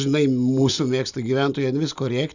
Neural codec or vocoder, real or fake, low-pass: none; real; 7.2 kHz